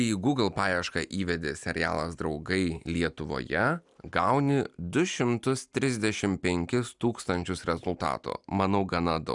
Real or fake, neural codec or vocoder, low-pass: real; none; 10.8 kHz